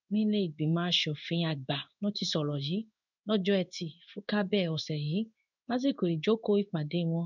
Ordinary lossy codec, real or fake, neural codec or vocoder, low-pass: none; fake; codec, 16 kHz in and 24 kHz out, 1 kbps, XY-Tokenizer; 7.2 kHz